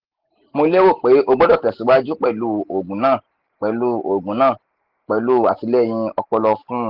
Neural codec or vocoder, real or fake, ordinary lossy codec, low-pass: none; real; Opus, 16 kbps; 5.4 kHz